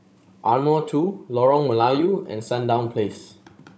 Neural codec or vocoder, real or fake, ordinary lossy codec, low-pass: codec, 16 kHz, 16 kbps, FunCodec, trained on Chinese and English, 50 frames a second; fake; none; none